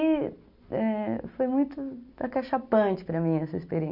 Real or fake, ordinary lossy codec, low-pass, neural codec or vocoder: real; none; 5.4 kHz; none